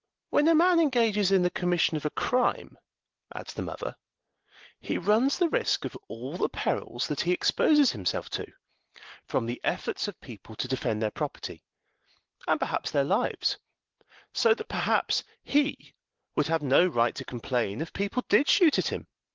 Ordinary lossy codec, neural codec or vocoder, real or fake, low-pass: Opus, 16 kbps; none; real; 7.2 kHz